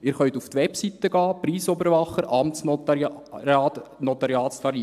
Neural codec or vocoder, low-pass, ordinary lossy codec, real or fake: none; 14.4 kHz; AAC, 96 kbps; real